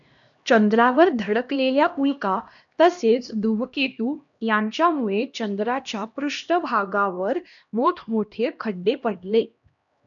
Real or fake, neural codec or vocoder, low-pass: fake; codec, 16 kHz, 1 kbps, X-Codec, HuBERT features, trained on LibriSpeech; 7.2 kHz